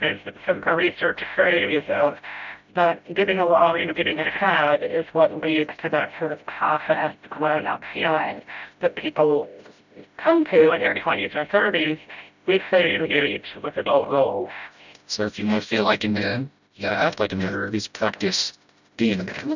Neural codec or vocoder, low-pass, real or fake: codec, 16 kHz, 0.5 kbps, FreqCodec, smaller model; 7.2 kHz; fake